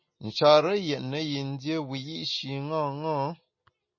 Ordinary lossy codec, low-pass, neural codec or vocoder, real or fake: MP3, 32 kbps; 7.2 kHz; none; real